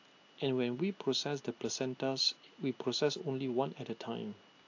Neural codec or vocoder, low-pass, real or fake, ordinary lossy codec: none; 7.2 kHz; real; AAC, 48 kbps